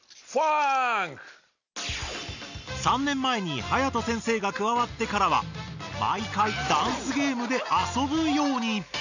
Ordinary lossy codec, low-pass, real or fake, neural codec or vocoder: none; 7.2 kHz; real; none